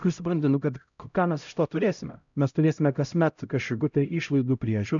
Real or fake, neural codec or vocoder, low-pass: fake; codec, 16 kHz, 0.5 kbps, X-Codec, HuBERT features, trained on LibriSpeech; 7.2 kHz